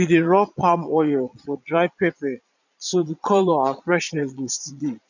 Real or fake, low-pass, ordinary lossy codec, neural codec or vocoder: fake; 7.2 kHz; none; vocoder, 22.05 kHz, 80 mel bands, Vocos